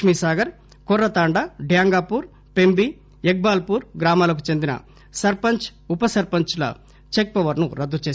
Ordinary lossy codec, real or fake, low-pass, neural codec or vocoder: none; real; none; none